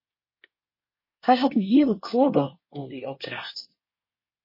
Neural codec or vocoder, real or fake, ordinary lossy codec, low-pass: codec, 24 kHz, 1 kbps, SNAC; fake; MP3, 24 kbps; 5.4 kHz